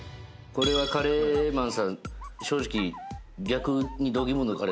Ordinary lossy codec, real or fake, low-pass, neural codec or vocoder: none; real; none; none